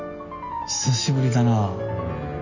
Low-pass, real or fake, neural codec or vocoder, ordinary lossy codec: 7.2 kHz; real; none; none